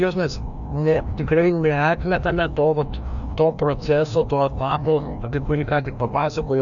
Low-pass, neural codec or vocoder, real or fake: 7.2 kHz; codec, 16 kHz, 1 kbps, FreqCodec, larger model; fake